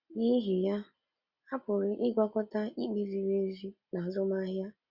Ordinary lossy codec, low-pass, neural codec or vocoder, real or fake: Opus, 64 kbps; 5.4 kHz; none; real